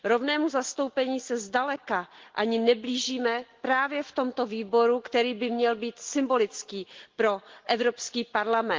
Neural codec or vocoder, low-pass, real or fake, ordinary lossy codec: none; 7.2 kHz; real; Opus, 16 kbps